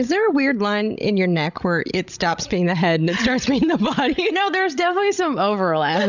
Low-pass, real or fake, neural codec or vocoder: 7.2 kHz; fake; codec, 16 kHz, 16 kbps, FreqCodec, larger model